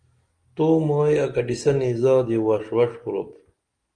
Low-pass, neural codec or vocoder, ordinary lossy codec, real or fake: 9.9 kHz; none; Opus, 24 kbps; real